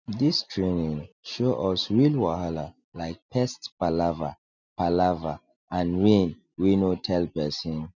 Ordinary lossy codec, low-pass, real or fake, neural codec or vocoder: none; 7.2 kHz; real; none